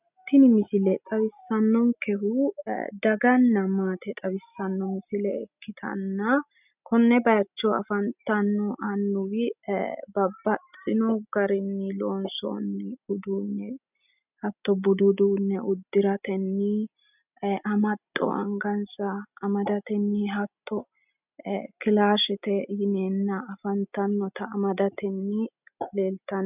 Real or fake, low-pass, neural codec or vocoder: real; 3.6 kHz; none